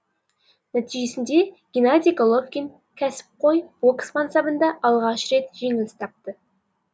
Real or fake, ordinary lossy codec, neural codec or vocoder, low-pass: real; none; none; none